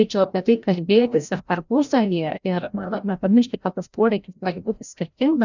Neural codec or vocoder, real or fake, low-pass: codec, 16 kHz, 0.5 kbps, FreqCodec, larger model; fake; 7.2 kHz